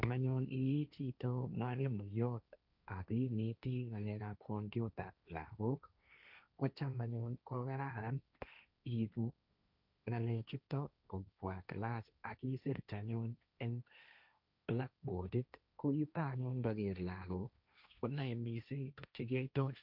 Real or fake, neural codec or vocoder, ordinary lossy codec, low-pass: fake; codec, 16 kHz, 1.1 kbps, Voila-Tokenizer; none; 5.4 kHz